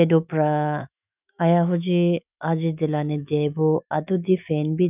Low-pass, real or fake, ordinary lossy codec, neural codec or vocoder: 3.6 kHz; fake; none; autoencoder, 48 kHz, 128 numbers a frame, DAC-VAE, trained on Japanese speech